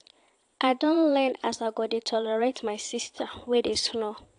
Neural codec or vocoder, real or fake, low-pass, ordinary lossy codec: vocoder, 22.05 kHz, 80 mel bands, Vocos; fake; 9.9 kHz; AAC, 64 kbps